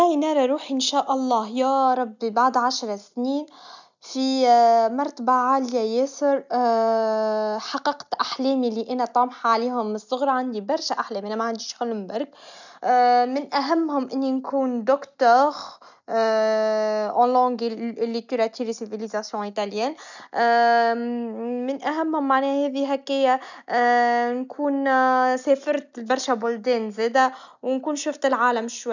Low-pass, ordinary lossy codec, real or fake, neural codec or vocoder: 7.2 kHz; none; real; none